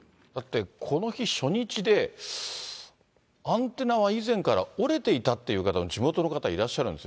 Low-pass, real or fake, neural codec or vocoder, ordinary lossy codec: none; real; none; none